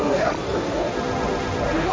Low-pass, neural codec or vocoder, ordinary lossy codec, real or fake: none; codec, 16 kHz, 1.1 kbps, Voila-Tokenizer; none; fake